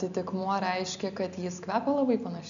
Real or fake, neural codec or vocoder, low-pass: real; none; 7.2 kHz